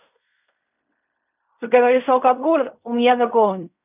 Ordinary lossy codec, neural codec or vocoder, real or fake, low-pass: none; codec, 16 kHz in and 24 kHz out, 0.4 kbps, LongCat-Audio-Codec, fine tuned four codebook decoder; fake; 3.6 kHz